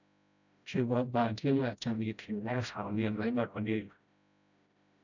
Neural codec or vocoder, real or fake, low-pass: codec, 16 kHz, 0.5 kbps, FreqCodec, smaller model; fake; 7.2 kHz